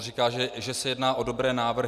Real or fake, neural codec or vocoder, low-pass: real; none; 14.4 kHz